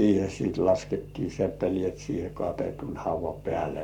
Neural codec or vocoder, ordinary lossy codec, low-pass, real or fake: codec, 44.1 kHz, 7.8 kbps, Pupu-Codec; none; 19.8 kHz; fake